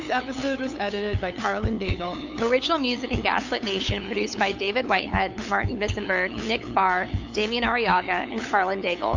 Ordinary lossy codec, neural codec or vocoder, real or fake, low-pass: AAC, 48 kbps; codec, 16 kHz, 8 kbps, FunCodec, trained on LibriTTS, 25 frames a second; fake; 7.2 kHz